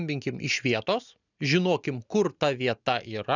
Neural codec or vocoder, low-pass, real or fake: none; 7.2 kHz; real